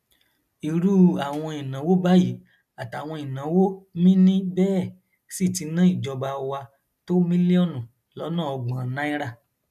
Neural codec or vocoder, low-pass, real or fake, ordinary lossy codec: none; 14.4 kHz; real; none